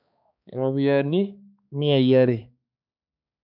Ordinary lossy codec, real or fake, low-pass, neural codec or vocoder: none; fake; 5.4 kHz; codec, 16 kHz, 4 kbps, X-Codec, HuBERT features, trained on balanced general audio